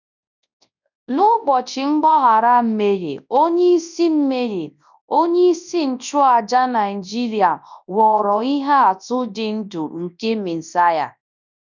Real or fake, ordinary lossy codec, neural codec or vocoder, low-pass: fake; none; codec, 24 kHz, 0.9 kbps, WavTokenizer, large speech release; 7.2 kHz